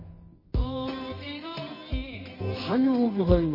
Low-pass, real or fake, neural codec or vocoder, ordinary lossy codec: 5.4 kHz; fake; codec, 24 kHz, 0.9 kbps, WavTokenizer, medium music audio release; MP3, 24 kbps